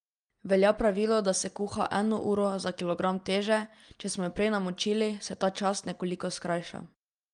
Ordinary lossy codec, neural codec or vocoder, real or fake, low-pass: Opus, 32 kbps; none; real; 9.9 kHz